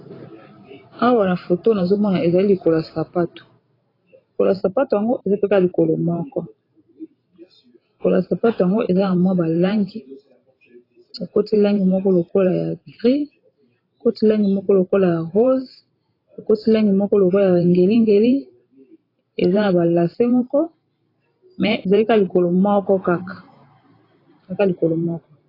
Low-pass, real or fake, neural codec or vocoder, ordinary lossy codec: 5.4 kHz; fake; vocoder, 44.1 kHz, 128 mel bands every 512 samples, BigVGAN v2; AAC, 24 kbps